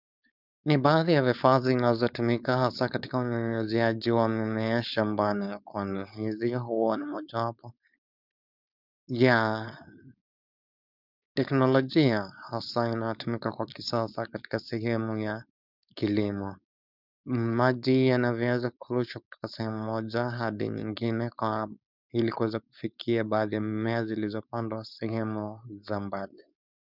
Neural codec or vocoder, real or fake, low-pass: codec, 16 kHz, 4.8 kbps, FACodec; fake; 5.4 kHz